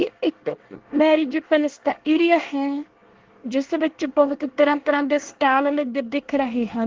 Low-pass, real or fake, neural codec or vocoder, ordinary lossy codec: 7.2 kHz; fake; codec, 24 kHz, 1 kbps, SNAC; Opus, 16 kbps